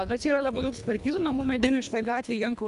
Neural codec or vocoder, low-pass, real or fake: codec, 24 kHz, 1.5 kbps, HILCodec; 10.8 kHz; fake